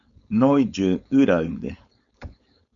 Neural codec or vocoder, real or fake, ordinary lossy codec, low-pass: codec, 16 kHz, 4.8 kbps, FACodec; fake; MP3, 96 kbps; 7.2 kHz